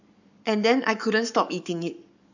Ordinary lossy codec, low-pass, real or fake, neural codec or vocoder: none; 7.2 kHz; fake; codec, 44.1 kHz, 7.8 kbps, Pupu-Codec